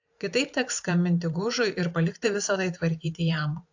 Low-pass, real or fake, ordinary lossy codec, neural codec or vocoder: 7.2 kHz; fake; AAC, 48 kbps; vocoder, 44.1 kHz, 128 mel bands every 512 samples, BigVGAN v2